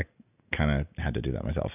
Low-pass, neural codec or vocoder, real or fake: 3.6 kHz; none; real